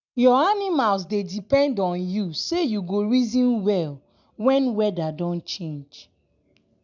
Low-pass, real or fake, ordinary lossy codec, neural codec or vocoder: 7.2 kHz; real; none; none